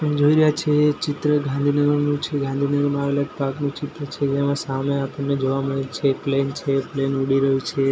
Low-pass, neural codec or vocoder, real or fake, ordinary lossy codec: none; none; real; none